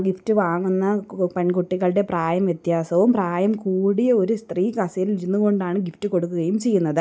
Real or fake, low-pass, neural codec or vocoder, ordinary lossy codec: real; none; none; none